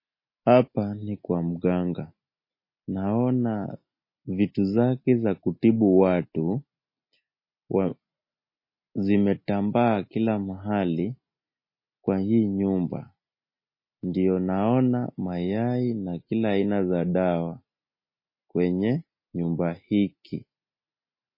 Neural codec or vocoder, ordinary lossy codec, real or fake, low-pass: none; MP3, 24 kbps; real; 5.4 kHz